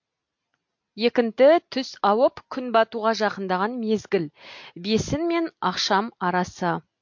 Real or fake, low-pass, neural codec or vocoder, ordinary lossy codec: real; 7.2 kHz; none; MP3, 48 kbps